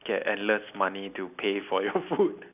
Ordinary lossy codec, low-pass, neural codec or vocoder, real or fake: none; 3.6 kHz; none; real